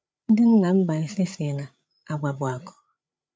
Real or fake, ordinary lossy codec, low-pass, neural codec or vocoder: fake; none; none; codec, 16 kHz, 8 kbps, FreqCodec, larger model